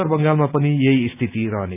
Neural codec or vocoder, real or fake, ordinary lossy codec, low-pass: none; real; none; 3.6 kHz